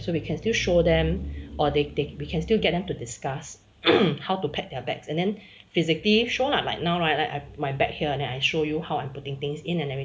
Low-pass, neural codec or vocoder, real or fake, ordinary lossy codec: none; none; real; none